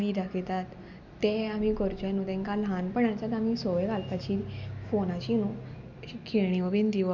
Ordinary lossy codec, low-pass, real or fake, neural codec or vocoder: none; 7.2 kHz; real; none